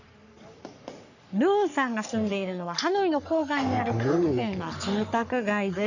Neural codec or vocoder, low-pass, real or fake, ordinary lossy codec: codec, 44.1 kHz, 3.4 kbps, Pupu-Codec; 7.2 kHz; fake; none